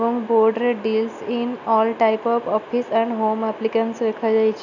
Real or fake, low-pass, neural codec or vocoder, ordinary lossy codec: real; 7.2 kHz; none; none